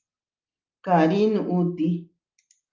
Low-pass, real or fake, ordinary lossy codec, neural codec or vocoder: 7.2 kHz; real; Opus, 24 kbps; none